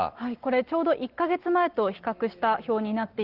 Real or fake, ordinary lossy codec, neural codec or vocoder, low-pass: real; Opus, 16 kbps; none; 5.4 kHz